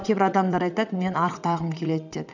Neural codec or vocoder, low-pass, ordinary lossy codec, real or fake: vocoder, 22.05 kHz, 80 mel bands, WaveNeXt; 7.2 kHz; none; fake